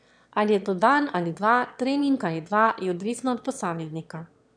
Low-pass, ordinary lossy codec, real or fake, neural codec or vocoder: 9.9 kHz; none; fake; autoencoder, 22.05 kHz, a latent of 192 numbers a frame, VITS, trained on one speaker